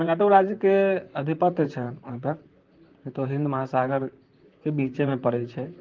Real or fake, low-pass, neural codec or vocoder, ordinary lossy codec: fake; 7.2 kHz; vocoder, 44.1 kHz, 128 mel bands, Pupu-Vocoder; Opus, 32 kbps